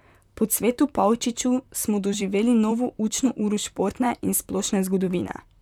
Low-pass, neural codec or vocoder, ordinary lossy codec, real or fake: 19.8 kHz; vocoder, 44.1 kHz, 128 mel bands, Pupu-Vocoder; none; fake